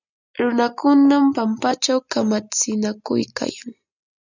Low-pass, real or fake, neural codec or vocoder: 7.2 kHz; real; none